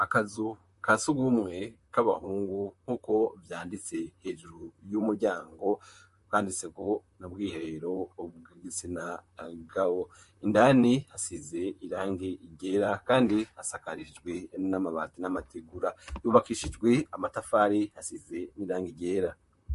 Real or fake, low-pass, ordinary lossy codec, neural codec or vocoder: fake; 14.4 kHz; MP3, 48 kbps; vocoder, 44.1 kHz, 128 mel bands, Pupu-Vocoder